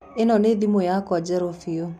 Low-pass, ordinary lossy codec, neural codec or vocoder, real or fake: 14.4 kHz; none; none; real